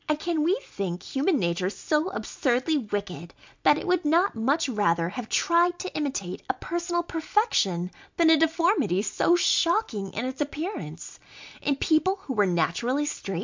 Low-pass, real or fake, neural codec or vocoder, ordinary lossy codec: 7.2 kHz; real; none; MP3, 64 kbps